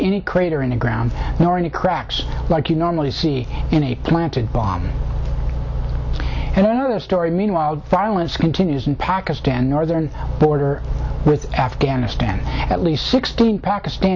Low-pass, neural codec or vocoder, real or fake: 7.2 kHz; none; real